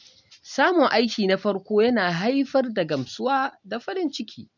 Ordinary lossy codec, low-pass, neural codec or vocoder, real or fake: none; 7.2 kHz; none; real